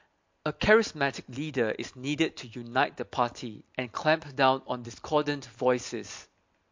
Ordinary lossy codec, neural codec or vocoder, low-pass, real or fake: MP3, 48 kbps; none; 7.2 kHz; real